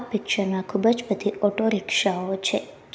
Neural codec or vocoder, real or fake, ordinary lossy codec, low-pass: none; real; none; none